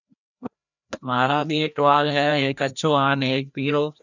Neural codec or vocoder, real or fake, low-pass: codec, 16 kHz, 1 kbps, FreqCodec, larger model; fake; 7.2 kHz